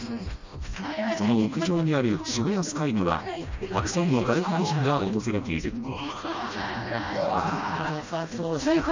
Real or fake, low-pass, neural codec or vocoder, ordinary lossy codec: fake; 7.2 kHz; codec, 16 kHz, 1 kbps, FreqCodec, smaller model; MP3, 64 kbps